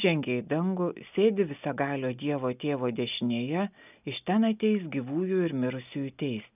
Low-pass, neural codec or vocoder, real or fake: 3.6 kHz; none; real